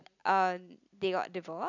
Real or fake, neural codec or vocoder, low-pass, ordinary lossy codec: real; none; 7.2 kHz; none